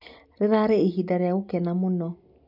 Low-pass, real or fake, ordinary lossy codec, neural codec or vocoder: 5.4 kHz; real; none; none